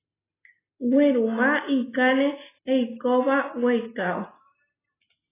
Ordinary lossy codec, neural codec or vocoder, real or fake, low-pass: AAC, 16 kbps; none; real; 3.6 kHz